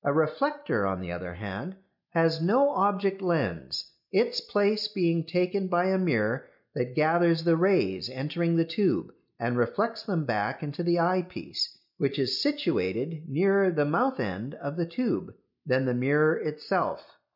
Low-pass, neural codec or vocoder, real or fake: 5.4 kHz; none; real